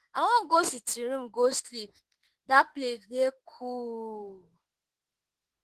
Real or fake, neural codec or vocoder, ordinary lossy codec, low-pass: fake; autoencoder, 48 kHz, 32 numbers a frame, DAC-VAE, trained on Japanese speech; Opus, 16 kbps; 14.4 kHz